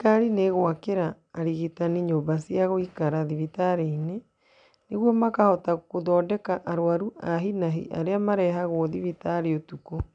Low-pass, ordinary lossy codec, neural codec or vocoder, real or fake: 9.9 kHz; MP3, 96 kbps; none; real